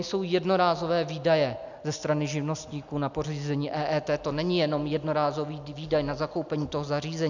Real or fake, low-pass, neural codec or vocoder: real; 7.2 kHz; none